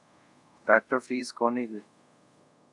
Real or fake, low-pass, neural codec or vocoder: fake; 10.8 kHz; codec, 24 kHz, 0.5 kbps, DualCodec